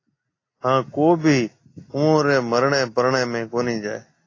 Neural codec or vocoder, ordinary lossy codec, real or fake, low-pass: none; AAC, 32 kbps; real; 7.2 kHz